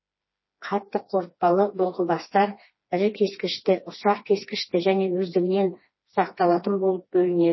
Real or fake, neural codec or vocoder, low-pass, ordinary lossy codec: fake; codec, 16 kHz, 2 kbps, FreqCodec, smaller model; 7.2 kHz; MP3, 24 kbps